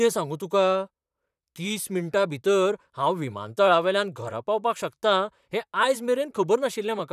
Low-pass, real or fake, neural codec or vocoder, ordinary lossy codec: 14.4 kHz; fake; vocoder, 44.1 kHz, 128 mel bands, Pupu-Vocoder; none